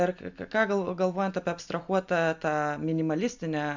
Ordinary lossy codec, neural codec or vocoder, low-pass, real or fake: MP3, 64 kbps; none; 7.2 kHz; real